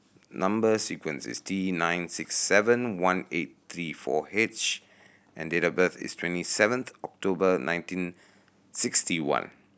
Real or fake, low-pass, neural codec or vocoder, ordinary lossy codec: real; none; none; none